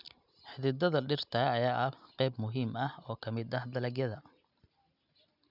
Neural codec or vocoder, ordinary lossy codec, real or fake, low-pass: none; none; real; 5.4 kHz